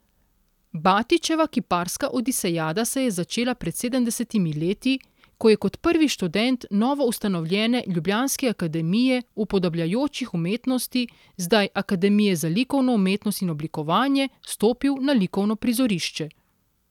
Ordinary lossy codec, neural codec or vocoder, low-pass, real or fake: none; none; 19.8 kHz; real